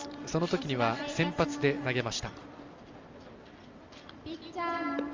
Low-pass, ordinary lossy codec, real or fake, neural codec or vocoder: 7.2 kHz; Opus, 32 kbps; real; none